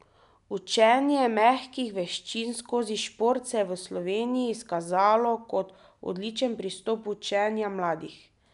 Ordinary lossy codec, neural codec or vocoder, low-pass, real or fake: none; none; 10.8 kHz; real